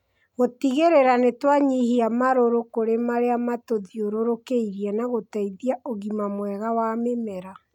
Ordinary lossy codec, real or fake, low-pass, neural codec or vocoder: none; real; 19.8 kHz; none